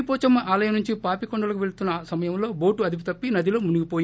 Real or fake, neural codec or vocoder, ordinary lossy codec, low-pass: real; none; none; none